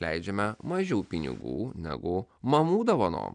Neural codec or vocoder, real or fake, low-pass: none; real; 9.9 kHz